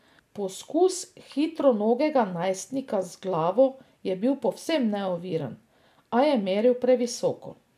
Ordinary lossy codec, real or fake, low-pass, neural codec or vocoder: none; real; 14.4 kHz; none